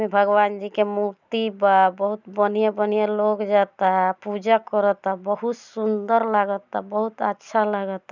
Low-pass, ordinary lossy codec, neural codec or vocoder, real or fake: 7.2 kHz; none; none; real